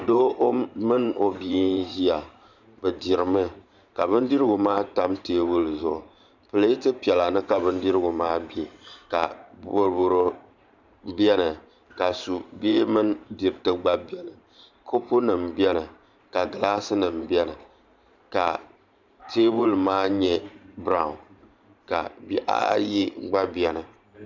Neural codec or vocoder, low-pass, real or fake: vocoder, 44.1 kHz, 128 mel bands every 512 samples, BigVGAN v2; 7.2 kHz; fake